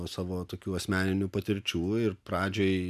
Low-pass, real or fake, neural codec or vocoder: 14.4 kHz; real; none